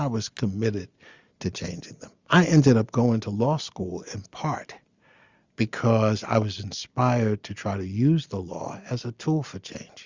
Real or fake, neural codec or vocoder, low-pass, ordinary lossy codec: real; none; 7.2 kHz; Opus, 64 kbps